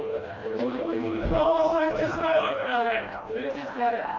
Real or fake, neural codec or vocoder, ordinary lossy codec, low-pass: fake; codec, 16 kHz, 1 kbps, FreqCodec, smaller model; AAC, 32 kbps; 7.2 kHz